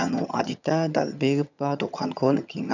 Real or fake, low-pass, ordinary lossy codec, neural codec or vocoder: fake; 7.2 kHz; none; vocoder, 22.05 kHz, 80 mel bands, HiFi-GAN